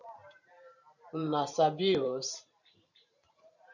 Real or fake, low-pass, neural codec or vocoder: fake; 7.2 kHz; vocoder, 44.1 kHz, 128 mel bands every 512 samples, BigVGAN v2